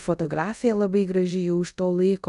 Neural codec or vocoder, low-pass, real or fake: codec, 24 kHz, 0.5 kbps, DualCodec; 10.8 kHz; fake